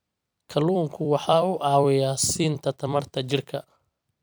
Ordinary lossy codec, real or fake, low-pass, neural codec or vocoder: none; fake; none; vocoder, 44.1 kHz, 128 mel bands every 256 samples, BigVGAN v2